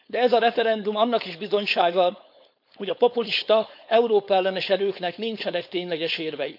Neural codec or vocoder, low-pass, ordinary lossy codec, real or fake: codec, 16 kHz, 4.8 kbps, FACodec; 5.4 kHz; none; fake